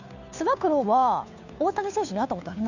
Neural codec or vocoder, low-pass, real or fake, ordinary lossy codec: codec, 16 kHz, 2 kbps, FunCodec, trained on Chinese and English, 25 frames a second; 7.2 kHz; fake; none